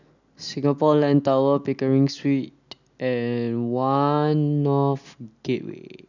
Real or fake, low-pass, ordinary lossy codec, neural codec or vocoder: real; 7.2 kHz; none; none